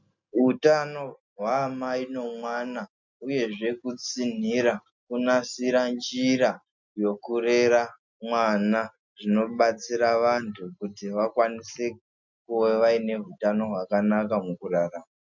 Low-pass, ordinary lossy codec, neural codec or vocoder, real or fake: 7.2 kHz; MP3, 64 kbps; none; real